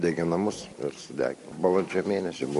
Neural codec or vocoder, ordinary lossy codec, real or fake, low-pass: none; MP3, 48 kbps; real; 14.4 kHz